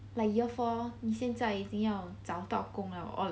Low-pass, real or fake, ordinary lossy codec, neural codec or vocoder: none; real; none; none